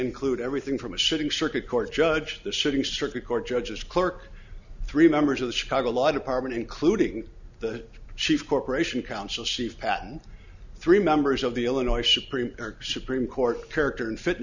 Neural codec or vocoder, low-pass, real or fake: none; 7.2 kHz; real